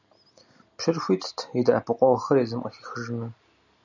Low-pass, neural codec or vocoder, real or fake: 7.2 kHz; none; real